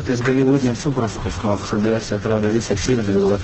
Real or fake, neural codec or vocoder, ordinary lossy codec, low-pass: fake; codec, 16 kHz, 1 kbps, FreqCodec, smaller model; Opus, 16 kbps; 7.2 kHz